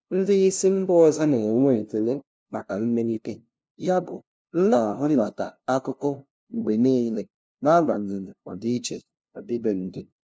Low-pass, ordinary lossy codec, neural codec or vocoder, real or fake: none; none; codec, 16 kHz, 0.5 kbps, FunCodec, trained on LibriTTS, 25 frames a second; fake